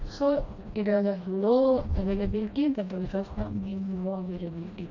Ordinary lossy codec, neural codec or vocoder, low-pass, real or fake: none; codec, 16 kHz, 1 kbps, FreqCodec, smaller model; 7.2 kHz; fake